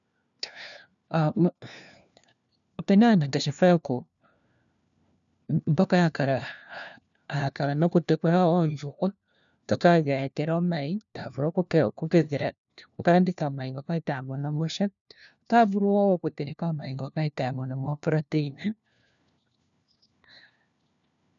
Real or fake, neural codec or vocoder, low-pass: fake; codec, 16 kHz, 1 kbps, FunCodec, trained on LibriTTS, 50 frames a second; 7.2 kHz